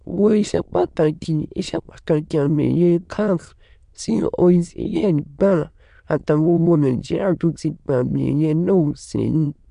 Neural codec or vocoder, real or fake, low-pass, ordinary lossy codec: autoencoder, 22.05 kHz, a latent of 192 numbers a frame, VITS, trained on many speakers; fake; 9.9 kHz; MP3, 64 kbps